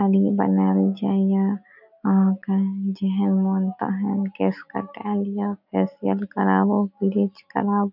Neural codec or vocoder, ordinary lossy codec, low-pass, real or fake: none; none; 5.4 kHz; real